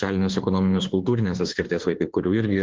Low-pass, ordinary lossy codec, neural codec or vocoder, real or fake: 7.2 kHz; Opus, 16 kbps; autoencoder, 48 kHz, 32 numbers a frame, DAC-VAE, trained on Japanese speech; fake